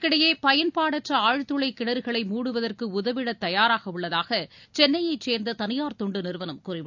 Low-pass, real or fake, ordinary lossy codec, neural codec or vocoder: 7.2 kHz; real; none; none